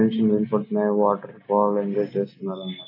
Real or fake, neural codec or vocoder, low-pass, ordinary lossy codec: real; none; 5.4 kHz; AAC, 32 kbps